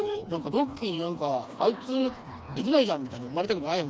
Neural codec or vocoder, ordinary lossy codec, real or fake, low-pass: codec, 16 kHz, 2 kbps, FreqCodec, smaller model; none; fake; none